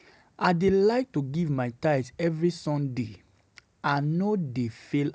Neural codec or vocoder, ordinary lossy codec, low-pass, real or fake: none; none; none; real